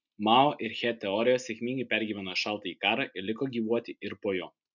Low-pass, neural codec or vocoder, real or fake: 7.2 kHz; none; real